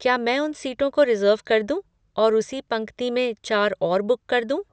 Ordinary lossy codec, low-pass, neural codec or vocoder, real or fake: none; none; none; real